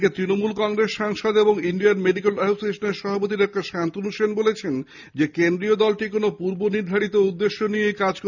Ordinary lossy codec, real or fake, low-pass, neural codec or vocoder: none; real; 7.2 kHz; none